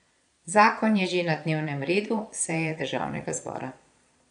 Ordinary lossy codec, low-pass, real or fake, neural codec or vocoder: none; 9.9 kHz; fake; vocoder, 22.05 kHz, 80 mel bands, Vocos